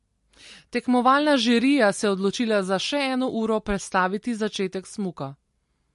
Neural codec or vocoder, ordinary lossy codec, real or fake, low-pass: none; MP3, 48 kbps; real; 14.4 kHz